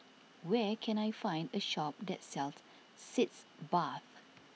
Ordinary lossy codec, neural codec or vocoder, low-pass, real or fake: none; none; none; real